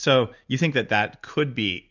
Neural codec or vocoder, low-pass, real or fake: none; 7.2 kHz; real